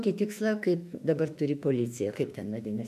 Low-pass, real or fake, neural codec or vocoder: 14.4 kHz; fake; autoencoder, 48 kHz, 32 numbers a frame, DAC-VAE, trained on Japanese speech